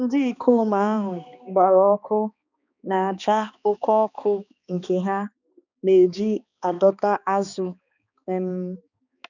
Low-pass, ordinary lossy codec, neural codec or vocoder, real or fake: 7.2 kHz; none; codec, 16 kHz, 2 kbps, X-Codec, HuBERT features, trained on balanced general audio; fake